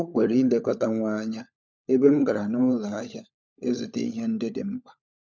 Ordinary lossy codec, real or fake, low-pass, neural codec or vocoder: none; fake; none; codec, 16 kHz, 16 kbps, FunCodec, trained on LibriTTS, 50 frames a second